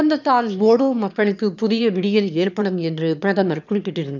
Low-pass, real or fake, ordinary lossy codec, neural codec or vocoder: 7.2 kHz; fake; none; autoencoder, 22.05 kHz, a latent of 192 numbers a frame, VITS, trained on one speaker